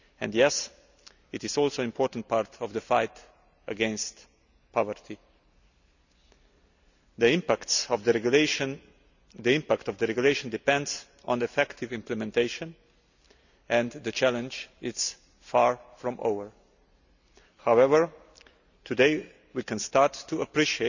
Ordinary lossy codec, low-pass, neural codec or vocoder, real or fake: none; 7.2 kHz; none; real